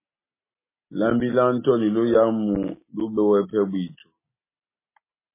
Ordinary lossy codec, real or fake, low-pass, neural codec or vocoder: MP3, 16 kbps; real; 3.6 kHz; none